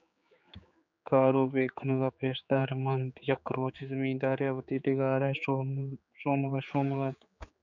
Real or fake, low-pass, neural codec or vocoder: fake; 7.2 kHz; codec, 16 kHz, 4 kbps, X-Codec, HuBERT features, trained on general audio